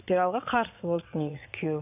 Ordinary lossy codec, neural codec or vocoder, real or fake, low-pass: AAC, 32 kbps; vocoder, 22.05 kHz, 80 mel bands, Vocos; fake; 3.6 kHz